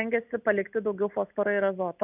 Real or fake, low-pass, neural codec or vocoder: real; 3.6 kHz; none